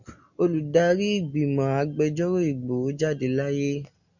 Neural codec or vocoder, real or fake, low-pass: none; real; 7.2 kHz